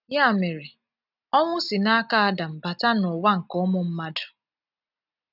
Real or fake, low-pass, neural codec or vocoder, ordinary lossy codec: real; 5.4 kHz; none; none